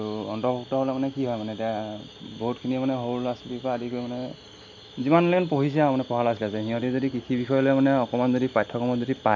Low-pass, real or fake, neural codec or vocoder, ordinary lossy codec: 7.2 kHz; real; none; none